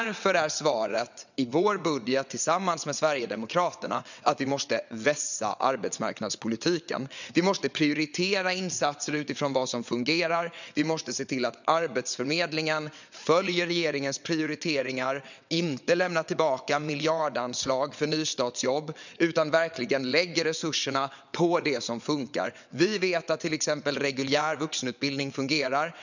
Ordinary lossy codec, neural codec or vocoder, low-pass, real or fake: none; vocoder, 22.05 kHz, 80 mel bands, WaveNeXt; 7.2 kHz; fake